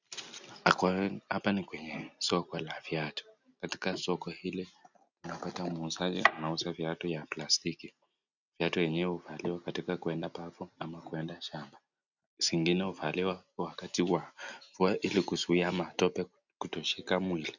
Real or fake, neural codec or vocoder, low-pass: real; none; 7.2 kHz